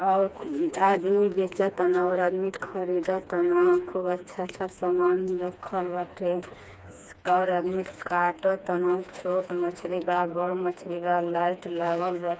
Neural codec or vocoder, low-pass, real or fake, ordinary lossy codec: codec, 16 kHz, 2 kbps, FreqCodec, smaller model; none; fake; none